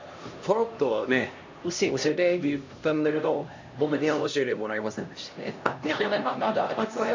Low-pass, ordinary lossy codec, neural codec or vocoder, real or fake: 7.2 kHz; MP3, 48 kbps; codec, 16 kHz, 1 kbps, X-Codec, HuBERT features, trained on LibriSpeech; fake